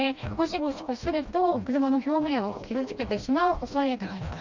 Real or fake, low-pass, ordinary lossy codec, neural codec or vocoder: fake; 7.2 kHz; MP3, 64 kbps; codec, 16 kHz, 1 kbps, FreqCodec, smaller model